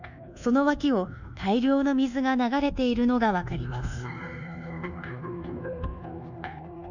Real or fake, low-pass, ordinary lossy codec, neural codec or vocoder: fake; 7.2 kHz; none; codec, 24 kHz, 1.2 kbps, DualCodec